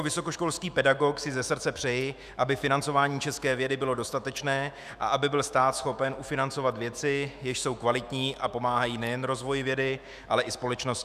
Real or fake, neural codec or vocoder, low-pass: fake; autoencoder, 48 kHz, 128 numbers a frame, DAC-VAE, trained on Japanese speech; 14.4 kHz